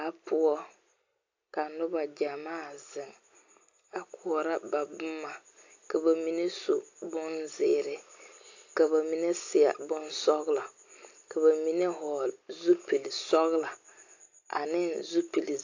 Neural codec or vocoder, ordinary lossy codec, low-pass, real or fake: none; AAC, 48 kbps; 7.2 kHz; real